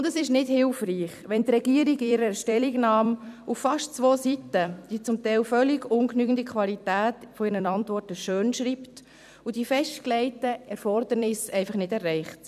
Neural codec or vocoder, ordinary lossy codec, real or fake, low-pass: vocoder, 44.1 kHz, 128 mel bands every 256 samples, BigVGAN v2; none; fake; 14.4 kHz